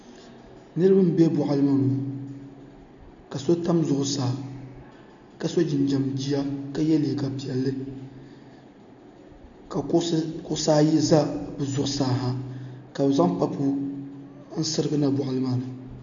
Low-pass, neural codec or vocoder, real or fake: 7.2 kHz; none; real